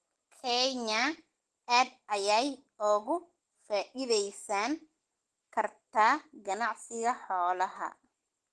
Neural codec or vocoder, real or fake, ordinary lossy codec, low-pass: none; real; Opus, 16 kbps; 10.8 kHz